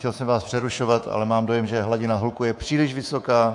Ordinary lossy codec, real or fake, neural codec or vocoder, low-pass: AAC, 64 kbps; fake; codec, 24 kHz, 3.1 kbps, DualCodec; 10.8 kHz